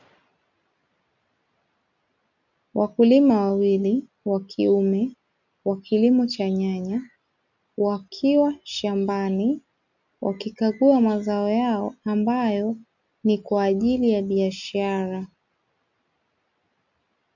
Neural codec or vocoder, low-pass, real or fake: none; 7.2 kHz; real